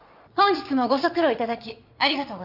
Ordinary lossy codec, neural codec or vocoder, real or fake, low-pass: none; vocoder, 22.05 kHz, 80 mel bands, Vocos; fake; 5.4 kHz